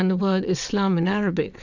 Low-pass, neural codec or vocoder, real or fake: 7.2 kHz; vocoder, 22.05 kHz, 80 mel bands, WaveNeXt; fake